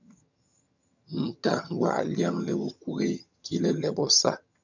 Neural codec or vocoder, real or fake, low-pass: vocoder, 22.05 kHz, 80 mel bands, HiFi-GAN; fake; 7.2 kHz